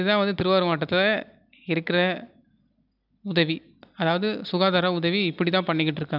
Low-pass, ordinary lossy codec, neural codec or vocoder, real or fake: 5.4 kHz; none; none; real